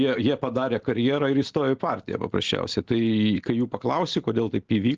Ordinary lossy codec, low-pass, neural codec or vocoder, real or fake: Opus, 16 kbps; 7.2 kHz; none; real